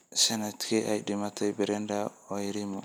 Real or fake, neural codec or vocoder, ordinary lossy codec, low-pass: real; none; none; none